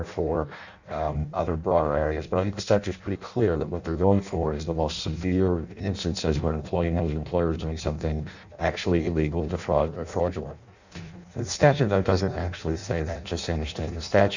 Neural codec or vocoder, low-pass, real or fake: codec, 16 kHz in and 24 kHz out, 0.6 kbps, FireRedTTS-2 codec; 7.2 kHz; fake